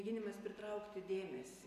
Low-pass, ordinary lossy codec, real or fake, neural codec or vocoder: 14.4 kHz; AAC, 96 kbps; real; none